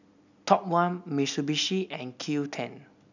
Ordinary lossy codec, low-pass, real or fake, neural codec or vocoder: none; 7.2 kHz; real; none